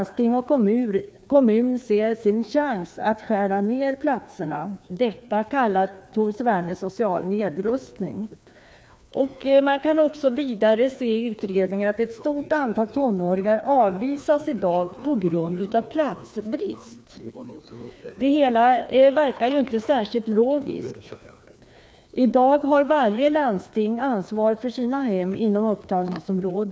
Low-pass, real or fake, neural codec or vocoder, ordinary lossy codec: none; fake; codec, 16 kHz, 2 kbps, FreqCodec, larger model; none